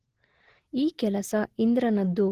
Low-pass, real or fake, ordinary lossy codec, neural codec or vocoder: 14.4 kHz; real; Opus, 16 kbps; none